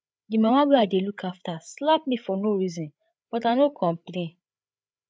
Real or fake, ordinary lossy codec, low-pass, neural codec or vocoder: fake; none; none; codec, 16 kHz, 8 kbps, FreqCodec, larger model